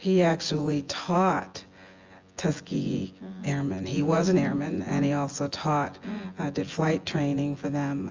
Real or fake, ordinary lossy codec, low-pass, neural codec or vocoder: fake; Opus, 32 kbps; 7.2 kHz; vocoder, 24 kHz, 100 mel bands, Vocos